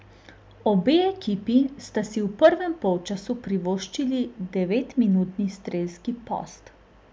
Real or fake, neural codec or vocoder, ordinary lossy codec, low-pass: real; none; none; none